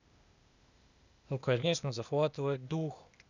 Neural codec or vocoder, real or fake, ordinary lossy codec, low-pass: codec, 16 kHz, 0.8 kbps, ZipCodec; fake; none; 7.2 kHz